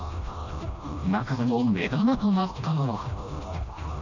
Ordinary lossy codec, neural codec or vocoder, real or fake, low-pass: none; codec, 16 kHz, 1 kbps, FreqCodec, smaller model; fake; 7.2 kHz